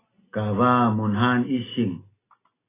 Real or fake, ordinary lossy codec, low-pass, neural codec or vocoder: real; AAC, 16 kbps; 3.6 kHz; none